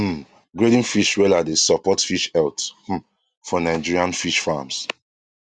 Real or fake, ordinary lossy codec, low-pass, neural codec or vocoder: real; Opus, 64 kbps; 9.9 kHz; none